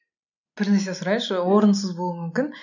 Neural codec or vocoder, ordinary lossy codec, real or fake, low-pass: none; none; real; 7.2 kHz